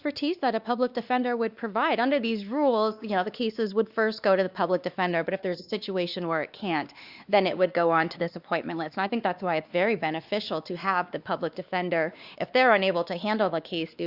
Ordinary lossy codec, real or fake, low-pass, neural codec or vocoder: Opus, 64 kbps; fake; 5.4 kHz; codec, 16 kHz, 2 kbps, X-Codec, WavLM features, trained on Multilingual LibriSpeech